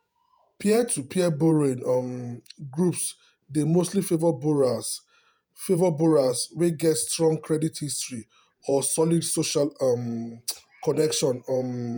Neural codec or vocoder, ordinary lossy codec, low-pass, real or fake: vocoder, 48 kHz, 128 mel bands, Vocos; none; none; fake